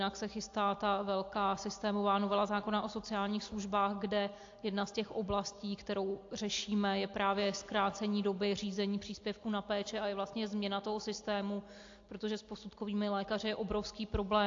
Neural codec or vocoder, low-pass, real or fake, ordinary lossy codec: none; 7.2 kHz; real; AAC, 48 kbps